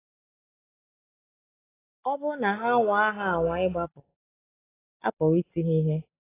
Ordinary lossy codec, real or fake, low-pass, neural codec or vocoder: AAC, 16 kbps; real; 3.6 kHz; none